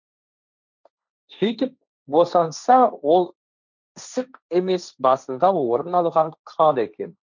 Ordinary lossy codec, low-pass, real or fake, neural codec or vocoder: none; 7.2 kHz; fake; codec, 16 kHz, 1.1 kbps, Voila-Tokenizer